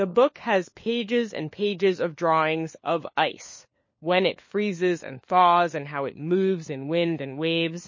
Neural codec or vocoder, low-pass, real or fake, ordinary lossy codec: codec, 16 kHz, 4 kbps, FunCodec, trained on LibriTTS, 50 frames a second; 7.2 kHz; fake; MP3, 32 kbps